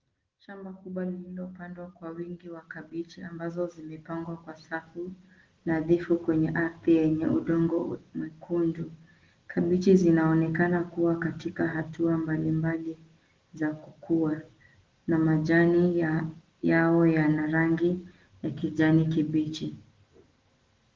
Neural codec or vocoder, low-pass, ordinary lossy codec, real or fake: none; 7.2 kHz; Opus, 16 kbps; real